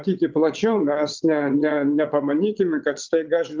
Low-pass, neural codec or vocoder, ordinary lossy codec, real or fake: 7.2 kHz; vocoder, 22.05 kHz, 80 mel bands, WaveNeXt; Opus, 32 kbps; fake